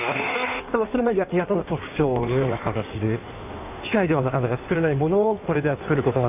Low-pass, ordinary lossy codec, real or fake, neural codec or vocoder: 3.6 kHz; none; fake; codec, 16 kHz in and 24 kHz out, 1.1 kbps, FireRedTTS-2 codec